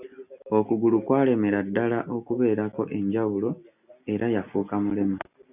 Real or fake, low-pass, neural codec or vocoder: real; 3.6 kHz; none